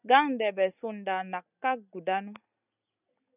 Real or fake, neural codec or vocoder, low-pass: real; none; 3.6 kHz